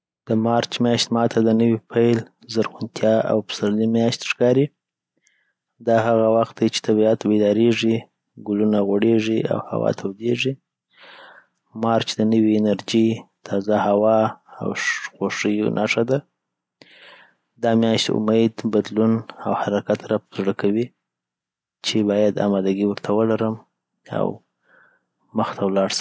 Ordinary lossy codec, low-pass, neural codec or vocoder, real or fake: none; none; none; real